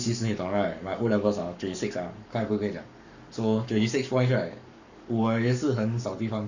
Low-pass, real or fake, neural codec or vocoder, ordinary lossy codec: 7.2 kHz; fake; codec, 44.1 kHz, 7.8 kbps, Pupu-Codec; none